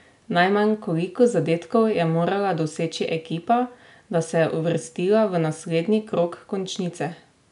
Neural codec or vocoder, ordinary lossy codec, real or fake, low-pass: none; none; real; 10.8 kHz